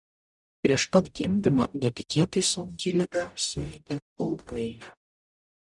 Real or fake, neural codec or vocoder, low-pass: fake; codec, 44.1 kHz, 0.9 kbps, DAC; 10.8 kHz